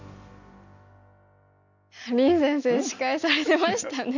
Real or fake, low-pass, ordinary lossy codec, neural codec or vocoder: real; 7.2 kHz; none; none